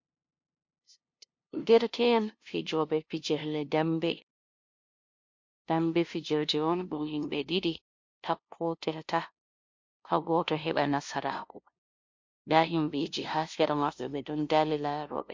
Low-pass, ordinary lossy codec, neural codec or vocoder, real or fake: 7.2 kHz; MP3, 48 kbps; codec, 16 kHz, 0.5 kbps, FunCodec, trained on LibriTTS, 25 frames a second; fake